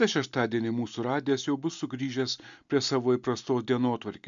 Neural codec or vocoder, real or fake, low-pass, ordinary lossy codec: none; real; 7.2 kHz; MP3, 64 kbps